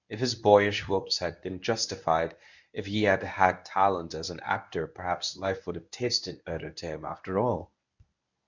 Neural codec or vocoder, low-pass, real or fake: codec, 24 kHz, 0.9 kbps, WavTokenizer, medium speech release version 1; 7.2 kHz; fake